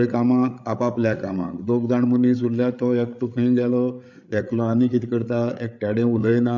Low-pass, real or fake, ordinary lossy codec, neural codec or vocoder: 7.2 kHz; fake; MP3, 64 kbps; codec, 16 kHz, 8 kbps, FreqCodec, larger model